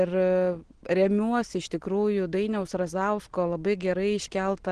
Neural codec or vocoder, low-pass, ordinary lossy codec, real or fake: none; 9.9 kHz; Opus, 16 kbps; real